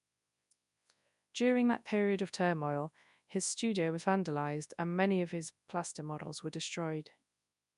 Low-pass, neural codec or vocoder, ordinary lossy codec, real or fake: 10.8 kHz; codec, 24 kHz, 0.9 kbps, WavTokenizer, large speech release; MP3, 96 kbps; fake